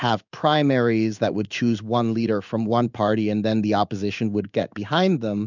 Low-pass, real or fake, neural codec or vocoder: 7.2 kHz; fake; codec, 16 kHz in and 24 kHz out, 1 kbps, XY-Tokenizer